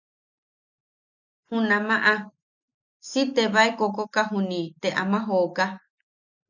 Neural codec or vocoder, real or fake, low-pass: none; real; 7.2 kHz